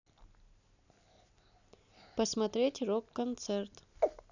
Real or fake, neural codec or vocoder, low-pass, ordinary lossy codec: real; none; 7.2 kHz; none